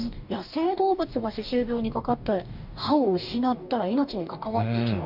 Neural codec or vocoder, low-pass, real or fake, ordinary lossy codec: codec, 44.1 kHz, 2.6 kbps, DAC; 5.4 kHz; fake; none